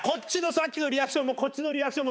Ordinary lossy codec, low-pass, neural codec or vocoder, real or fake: none; none; codec, 16 kHz, 4 kbps, X-Codec, HuBERT features, trained on balanced general audio; fake